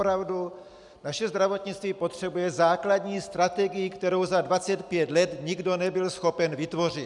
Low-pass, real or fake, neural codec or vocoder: 10.8 kHz; real; none